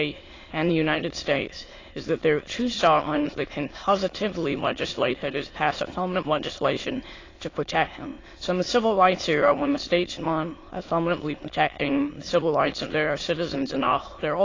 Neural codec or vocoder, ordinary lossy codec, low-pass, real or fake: autoencoder, 22.05 kHz, a latent of 192 numbers a frame, VITS, trained on many speakers; AAC, 32 kbps; 7.2 kHz; fake